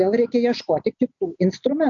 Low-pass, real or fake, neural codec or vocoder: 7.2 kHz; real; none